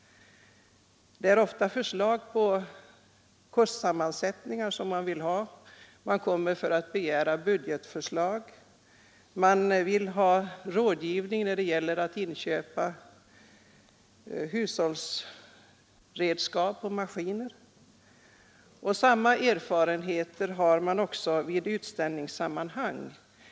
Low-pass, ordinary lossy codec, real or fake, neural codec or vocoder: none; none; real; none